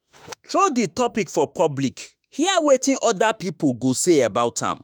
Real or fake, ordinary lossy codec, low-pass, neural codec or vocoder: fake; none; none; autoencoder, 48 kHz, 32 numbers a frame, DAC-VAE, trained on Japanese speech